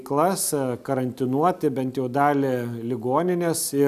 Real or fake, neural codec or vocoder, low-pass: real; none; 14.4 kHz